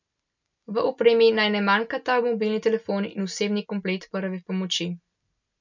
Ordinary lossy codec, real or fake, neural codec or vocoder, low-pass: none; real; none; 7.2 kHz